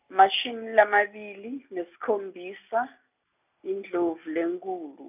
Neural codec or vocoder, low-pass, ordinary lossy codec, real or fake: none; 3.6 kHz; none; real